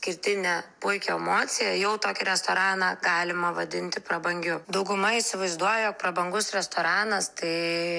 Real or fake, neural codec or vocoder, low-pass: real; none; 9.9 kHz